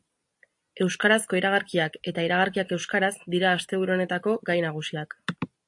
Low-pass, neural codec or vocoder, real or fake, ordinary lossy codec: 10.8 kHz; none; real; AAC, 64 kbps